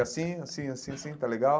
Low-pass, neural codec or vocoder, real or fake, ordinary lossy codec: none; none; real; none